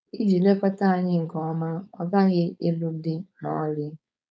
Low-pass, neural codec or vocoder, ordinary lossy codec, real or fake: none; codec, 16 kHz, 4.8 kbps, FACodec; none; fake